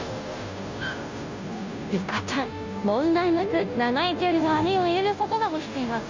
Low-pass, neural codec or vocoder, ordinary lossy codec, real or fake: 7.2 kHz; codec, 16 kHz, 0.5 kbps, FunCodec, trained on Chinese and English, 25 frames a second; MP3, 48 kbps; fake